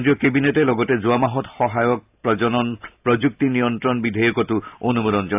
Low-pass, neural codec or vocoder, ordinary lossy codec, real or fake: 3.6 kHz; none; AAC, 32 kbps; real